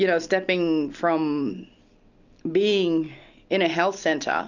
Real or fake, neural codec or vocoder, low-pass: real; none; 7.2 kHz